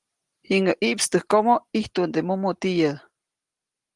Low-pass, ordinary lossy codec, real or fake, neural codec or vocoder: 10.8 kHz; Opus, 24 kbps; real; none